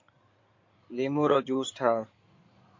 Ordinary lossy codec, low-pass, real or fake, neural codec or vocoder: MP3, 48 kbps; 7.2 kHz; fake; codec, 16 kHz in and 24 kHz out, 2.2 kbps, FireRedTTS-2 codec